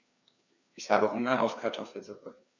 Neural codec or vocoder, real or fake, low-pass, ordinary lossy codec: codec, 16 kHz, 2 kbps, FreqCodec, larger model; fake; 7.2 kHz; MP3, 64 kbps